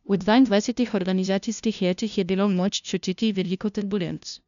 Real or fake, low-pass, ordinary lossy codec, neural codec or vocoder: fake; 7.2 kHz; none; codec, 16 kHz, 0.5 kbps, FunCodec, trained on LibriTTS, 25 frames a second